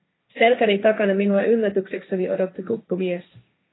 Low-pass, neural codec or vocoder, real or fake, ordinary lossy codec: 7.2 kHz; codec, 16 kHz, 1.1 kbps, Voila-Tokenizer; fake; AAC, 16 kbps